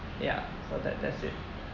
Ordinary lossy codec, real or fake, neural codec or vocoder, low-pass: none; real; none; 7.2 kHz